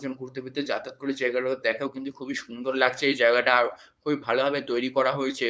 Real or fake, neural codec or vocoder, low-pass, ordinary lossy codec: fake; codec, 16 kHz, 4.8 kbps, FACodec; none; none